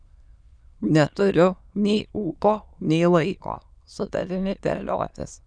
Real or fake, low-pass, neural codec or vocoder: fake; 9.9 kHz; autoencoder, 22.05 kHz, a latent of 192 numbers a frame, VITS, trained on many speakers